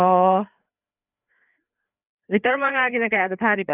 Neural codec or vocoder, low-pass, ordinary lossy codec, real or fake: codec, 16 kHz, 4 kbps, FreqCodec, larger model; 3.6 kHz; none; fake